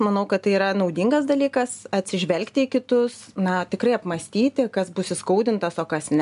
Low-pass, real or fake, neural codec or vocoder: 10.8 kHz; real; none